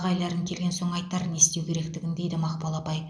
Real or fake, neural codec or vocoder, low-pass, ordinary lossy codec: real; none; none; none